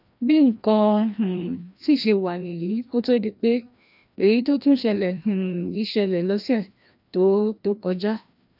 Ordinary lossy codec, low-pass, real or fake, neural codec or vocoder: none; 5.4 kHz; fake; codec, 16 kHz, 1 kbps, FreqCodec, larger model